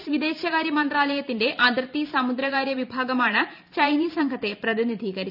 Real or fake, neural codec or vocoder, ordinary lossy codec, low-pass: fake; vocoder, 44.1 kHz, 128 mel bands every 512 samples, BigVGAN v2; none; 5.4 kHz